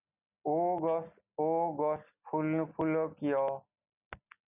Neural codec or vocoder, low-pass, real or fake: none; 3.6 kHz; real